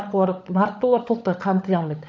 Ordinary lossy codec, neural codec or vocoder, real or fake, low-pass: none; codec, 16 kHz, 16 kbps, FunCodec, trained on LibriTTS, 50 frames a second; fake; none